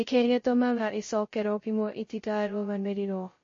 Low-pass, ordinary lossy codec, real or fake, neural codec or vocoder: 7.2 kHz; MP3, 32 kbps; fake; codec, 16 kHz, 0.2 kbps, FocalCodec